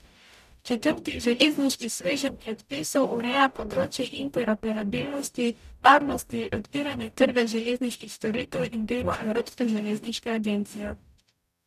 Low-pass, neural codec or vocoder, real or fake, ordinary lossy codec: 14.4 kHz; codec, 44.1 kHz, 0.9 kbps, DAC; fake; none